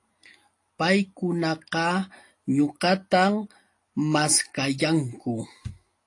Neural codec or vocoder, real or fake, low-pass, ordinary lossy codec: none; real; 10.8 kHz; AAC, 48 kbps